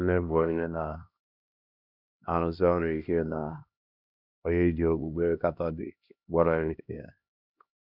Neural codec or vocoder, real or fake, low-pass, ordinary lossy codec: codec, 16 kHz, 1 kbps, X-Codec, HuBERT features, trained on LibriSpeech; fake; 5.4 kHz; none